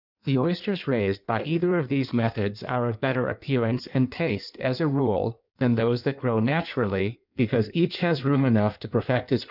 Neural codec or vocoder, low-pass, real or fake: codec, 16 kHz in and 24 kHz out, 1.1 kbps, FireRedTTS-2 codec; 5.4 kHz; fake